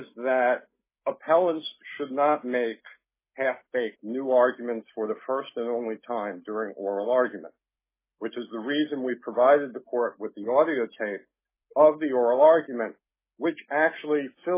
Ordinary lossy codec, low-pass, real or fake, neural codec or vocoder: MP3, 16 kbps; 3.6 kHz; real; none